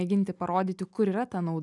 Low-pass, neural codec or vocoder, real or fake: 10.8 kHz; none; real